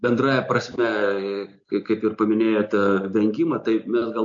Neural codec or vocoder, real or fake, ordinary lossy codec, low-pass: none; real; MP3, 64 kbps; 7.2 kHz